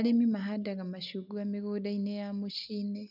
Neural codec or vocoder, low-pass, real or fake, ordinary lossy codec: none; 5.4 kHz; real; none